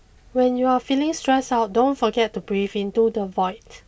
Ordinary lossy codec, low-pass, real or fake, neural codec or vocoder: none; none; real; none